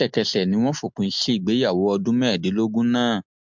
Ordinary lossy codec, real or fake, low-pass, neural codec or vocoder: MP3, 64 kbps; real; 7.2 kHz; none